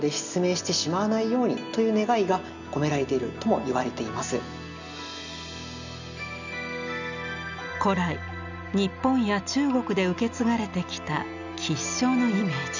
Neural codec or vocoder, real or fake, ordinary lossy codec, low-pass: none; real; none; 7.2 kHz